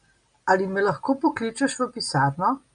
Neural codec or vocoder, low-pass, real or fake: none; 9.9 kHz; real